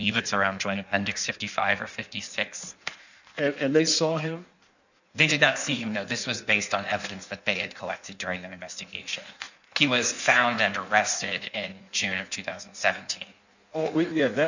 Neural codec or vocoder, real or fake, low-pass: codec, 16 kHz in and 24 kHz out, 1.1 kbps, FireRedTTS-2 codec; fake; 7.2 kHz